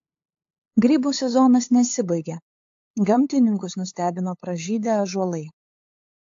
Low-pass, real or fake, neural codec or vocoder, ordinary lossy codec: 7.2 kHz; fake; codec, 16 kHz, 8 kbps, FunCodec, trained on LibriTTS, 25 frames a second; AAC, 48 kbps